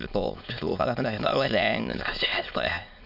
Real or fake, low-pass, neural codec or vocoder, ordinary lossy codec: fake; 5.4 kHz; autoencoder, 22.05 kHz, a latent of 192 numbers a frame, VITS, trained on many speakers; none